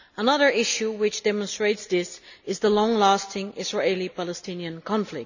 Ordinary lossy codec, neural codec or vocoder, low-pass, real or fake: none; none; 7.2 kHz; real